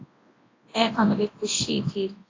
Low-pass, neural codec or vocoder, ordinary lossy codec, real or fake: 7.2 kHz; codec, 24 kHz, 0.9 kbps, WavTokenizer, large speech release; AAC, 32 kbps; fake